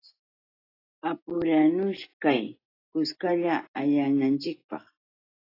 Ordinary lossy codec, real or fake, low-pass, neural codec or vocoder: AAC, 24 kbps; real; 5.4 kHz; none